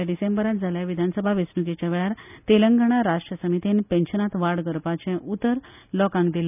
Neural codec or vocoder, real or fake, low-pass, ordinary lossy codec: none; real; 3.6 kHz; none